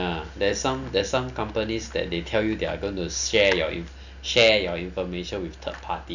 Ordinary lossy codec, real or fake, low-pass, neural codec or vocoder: none; real; 7.2 kHz; none